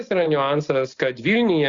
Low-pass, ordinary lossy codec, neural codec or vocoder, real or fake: 7.2 kHz; Opus, 64 kbps; none; real